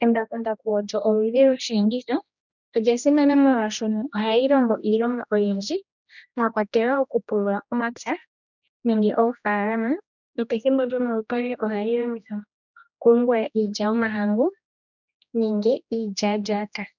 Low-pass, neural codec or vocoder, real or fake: 7.2 kHz; codec, 16 kHz, 1 kbps, X-Codec, HuBERT features, trained on general audio; fake